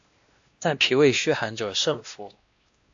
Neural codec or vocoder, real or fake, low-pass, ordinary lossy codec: codec, 16 kHz, 1 kbps, X-Codec, WavLM features, trained on Multilingual LibriSpeech; fake; 7.2 kHz; AAC, 64 kbps